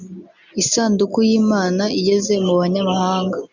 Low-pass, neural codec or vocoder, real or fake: 7.2 kHz; none; real